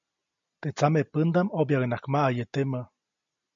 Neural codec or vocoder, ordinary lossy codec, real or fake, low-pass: none; MP3, 64 kbps; real; 7.2 kHz